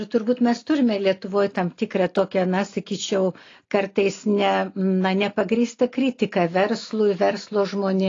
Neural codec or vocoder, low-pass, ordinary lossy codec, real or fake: none; 7.2 kHz; AAC, 32 kbps; real